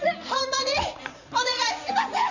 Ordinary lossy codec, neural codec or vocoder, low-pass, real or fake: none; vocoder, 44.1 kHz, 80 mel bands, Vocos; 7.2 kHz; fake